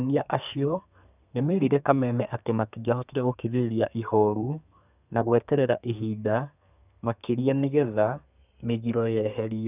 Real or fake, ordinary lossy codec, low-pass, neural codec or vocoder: fake; none; 3.6 kHz; codec, 32 kHz, 1.9 kbps, SNAC